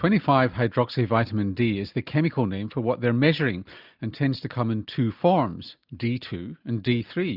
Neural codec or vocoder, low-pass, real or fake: none; 5.4 kHz; real